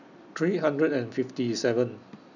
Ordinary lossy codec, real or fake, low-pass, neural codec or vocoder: none; real; 7.2 kHz; none